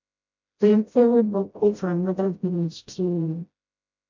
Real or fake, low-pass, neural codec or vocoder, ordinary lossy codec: fake; 7.2 kHz; codec, 16 kHz, 0.5 kbps, FreqCodec, smaller model; MP3, 64 kbps